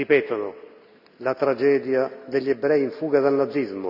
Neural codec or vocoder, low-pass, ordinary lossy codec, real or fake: none; 5.4 kHz; none; real